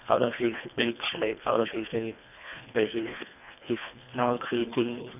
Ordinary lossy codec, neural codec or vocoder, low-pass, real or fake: none; codec, 24 kHz, 1.5 kbps, HILCodec; 3.6 kHz; fake